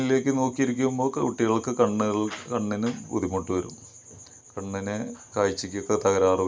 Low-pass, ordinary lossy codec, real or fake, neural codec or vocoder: none; none; real; none